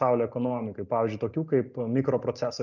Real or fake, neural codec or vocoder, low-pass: real; none; 7.2 kHz